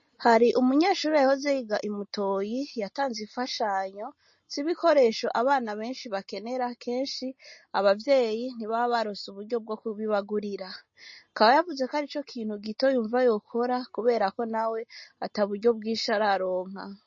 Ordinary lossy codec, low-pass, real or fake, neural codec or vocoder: MP3, 32 kbps; 7.2 kHz; real; none